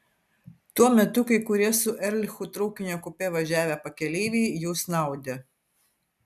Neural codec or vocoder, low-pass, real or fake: none; 14.4 kHz; real